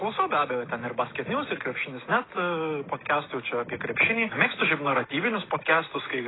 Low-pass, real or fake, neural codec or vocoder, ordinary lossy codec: 7.2 kHz; real; none; AAC, 16 kbps